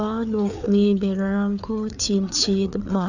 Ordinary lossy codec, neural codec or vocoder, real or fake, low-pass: none; codec, 16 kHz, 4 kbps, FreqCodec, larger model; fake; 7.2 kHz